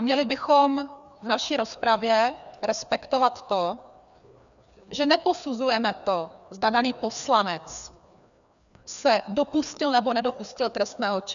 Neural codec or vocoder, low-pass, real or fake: codec, 16 kHz, 2 kbps, FreqCodec, larger model; 7.2 kHz; fake